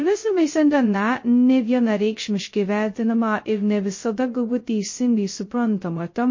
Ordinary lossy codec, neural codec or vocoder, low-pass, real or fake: MP3, 32 kbps; codec, 16 kHz, 0.2 kbps, FocalCodec; 7.2 kHz; fake